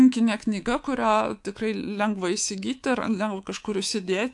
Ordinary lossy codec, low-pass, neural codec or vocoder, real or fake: AAC, 64 kbps; 10.8 kHz; codec, 24 kHz, 3.1 kbps, DualCodec; fake